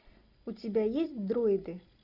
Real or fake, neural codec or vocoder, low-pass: real; none; 5.4 kHz